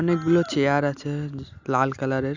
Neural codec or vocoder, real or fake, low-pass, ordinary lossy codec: none; real; 7.2 kHz; none